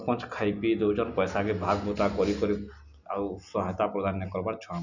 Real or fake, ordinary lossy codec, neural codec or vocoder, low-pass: real; none; none; 7.2 kHz